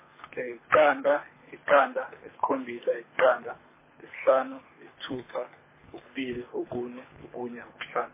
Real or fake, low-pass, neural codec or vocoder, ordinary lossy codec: fake; 3.6 kHz; codec, 24 kHz, 3 kbps, HILCodec; MP3, 16 kbps